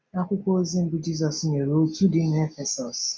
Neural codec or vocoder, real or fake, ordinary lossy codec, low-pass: none; real; none; none